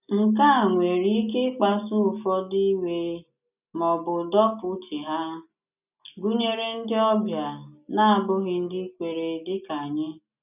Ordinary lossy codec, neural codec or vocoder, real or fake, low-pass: none; none; real; 3.6 kHz